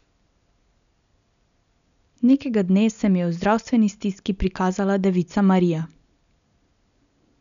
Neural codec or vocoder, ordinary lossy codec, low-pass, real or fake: none; none; 7.2 kHz; real